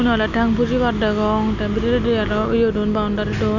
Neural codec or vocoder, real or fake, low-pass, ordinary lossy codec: none; real; 7.2 kHz; none